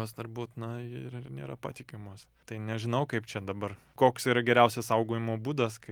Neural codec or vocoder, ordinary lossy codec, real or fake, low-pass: none; Opus, 32 kbps; real; 19.8 kHz